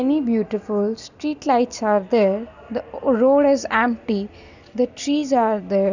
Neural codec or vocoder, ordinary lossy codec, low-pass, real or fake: none; none; 7.2 kHz; real